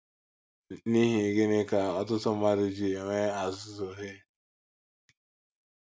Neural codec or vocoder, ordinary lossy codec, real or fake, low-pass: none; none; real; none